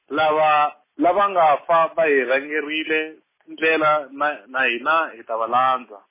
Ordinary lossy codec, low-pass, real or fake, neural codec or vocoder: MP3, 16 kbps; 3.6 kHz; real; none